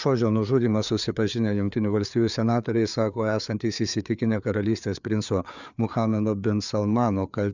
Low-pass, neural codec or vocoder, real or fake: 7.2 kHz; codec, 16 kHz, 4 kbps, FreqCodec, larger model; fake